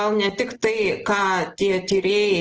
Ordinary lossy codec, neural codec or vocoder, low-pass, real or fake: Opus, 16 kbps; none; 7.2 kHz; real